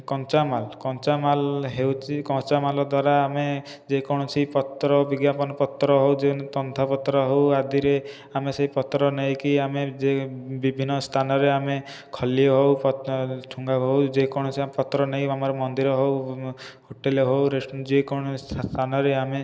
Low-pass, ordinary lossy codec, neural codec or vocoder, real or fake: none; none; none; real